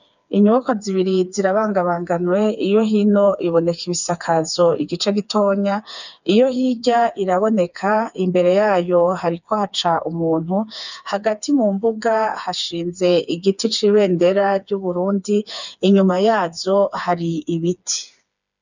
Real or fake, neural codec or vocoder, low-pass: fake; codec, 16 kHz, 4 kbps, FreqCodec, smaller model; 7.2 kHz